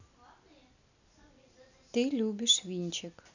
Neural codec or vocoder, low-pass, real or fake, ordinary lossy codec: none; 7.2 kHz; real; none